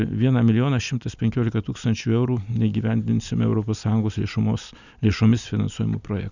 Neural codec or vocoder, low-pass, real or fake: none; 7.2 kHz; real